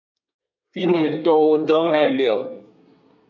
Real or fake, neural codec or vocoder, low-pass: fake; codec, 24 kHz, 1 kbps, SNAC; 7.2 kHz